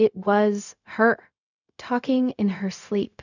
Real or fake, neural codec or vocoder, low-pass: fake; codec, 16 kHz, 0.4 kbps, LongCat-Audio-Codec; 7.2 kHz